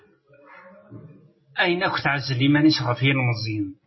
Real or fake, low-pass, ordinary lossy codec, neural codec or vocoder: real; 7.2 kHz; MP3, 24 kbps; none